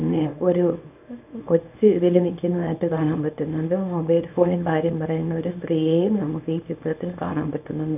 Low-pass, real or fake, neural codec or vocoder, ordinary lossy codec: 3.6 kHz; fake; codec, 24 kHz, 0.9 kbps, WavTokenizer, small release; none